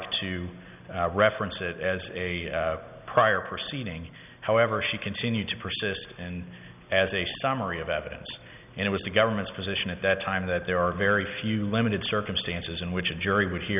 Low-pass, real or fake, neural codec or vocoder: 3.6 kHz; real; none